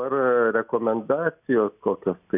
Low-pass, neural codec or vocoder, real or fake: 3.6 kHz; none; real